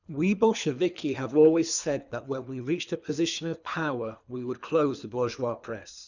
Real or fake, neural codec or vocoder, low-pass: fake; codec, 24 kHz, 3 kbps, HILCodec; 7.2 kHz